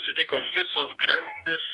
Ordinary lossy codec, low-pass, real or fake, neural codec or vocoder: AAC, 64 kbps; 10.8 kHz; fake; codec, 44.1 kHz, 2.6 kbps, DAC